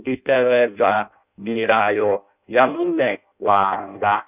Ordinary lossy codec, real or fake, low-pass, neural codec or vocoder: none; fake; 3.6 kHz; codec, 16 kHz in and 24 kHz out, 0.6 kbps, FireRedTTS-2 codec